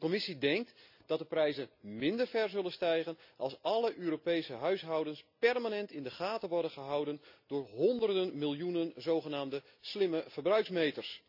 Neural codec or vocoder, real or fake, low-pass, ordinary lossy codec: none; real; 5.4 kHz; none